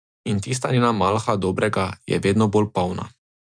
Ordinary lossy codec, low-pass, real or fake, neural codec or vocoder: MP3, 96 kbps; 9.9 kHz; real; none